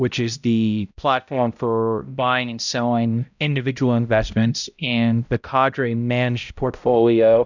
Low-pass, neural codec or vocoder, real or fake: 7.2 kHz; codec, 16 kHz, 0.5 kbps, X-Codec, HuBERT features, trained on balanced general audio; fake